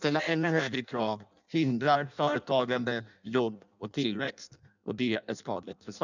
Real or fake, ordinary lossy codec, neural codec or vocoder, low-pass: fake; none; codec, 16 kHz in and 24 kHz out, 0.6 kbps, FireRedTTS-2 codec; 7.2 kHz